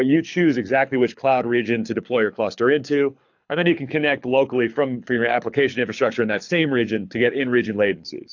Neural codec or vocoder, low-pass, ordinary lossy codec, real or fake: codec, 24 kHz, 6 kbps, HILCodec; 7.2 kHz; AAC, 48 kbps; fake